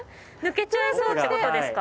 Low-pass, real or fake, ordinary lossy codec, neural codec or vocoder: none; real; none; none